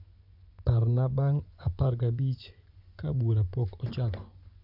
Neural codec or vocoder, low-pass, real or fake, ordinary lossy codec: vocoder, 24 kHz, 100 mel bands, Vocos; 5.4 kHz; fake; none